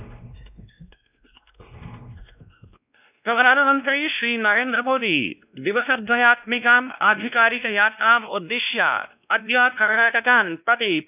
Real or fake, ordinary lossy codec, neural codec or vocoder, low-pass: fake; none; codec, 16 kHz, 1 kbps, X-Codec, WavLM features, trained on Multilingual LibriSpeech; 3.6 kHz